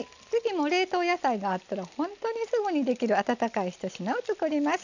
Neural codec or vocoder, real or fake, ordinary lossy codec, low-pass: none; real; none; 7.2 kHz